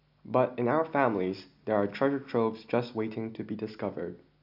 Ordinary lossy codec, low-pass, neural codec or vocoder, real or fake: none; 5.4 kHz; none; real